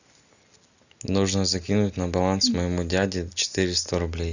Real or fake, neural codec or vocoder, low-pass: real; none; 7.2 kHz